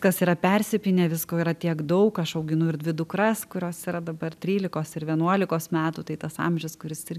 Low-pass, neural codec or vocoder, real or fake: 14.4 kHz; none; real